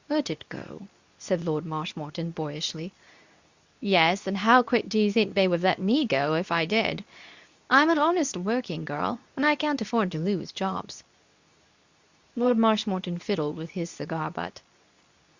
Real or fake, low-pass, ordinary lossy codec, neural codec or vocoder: fake; 7.2 kHz; Opus, 64 kbps; codec, 24 kHz, 0.9 kbps, WavTokenizer, medium speech release version 2